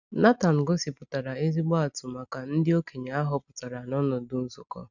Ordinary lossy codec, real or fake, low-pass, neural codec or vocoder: none; real; 7.2 kHz; none